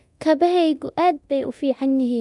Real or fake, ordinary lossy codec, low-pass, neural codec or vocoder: fake; none; 10.8 kHz; codec, 24 kHz, 0.9 kbps, DualCodec